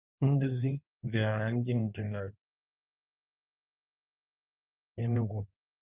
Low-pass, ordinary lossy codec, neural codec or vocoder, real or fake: 3.6 kHz; Opus, 32 kbps; codec, 16 kHz in and 24 kHz out, 1.1 kbps, FireRedTTS-2 codec; fake